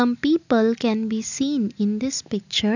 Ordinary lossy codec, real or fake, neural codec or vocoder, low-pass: none; real; none; 7.2 kHz